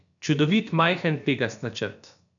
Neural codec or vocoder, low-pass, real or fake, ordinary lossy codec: codec, 16 kHz, about 1 kbps, DyCAST, with the encoder's durations; 7.2 kHz; fake; none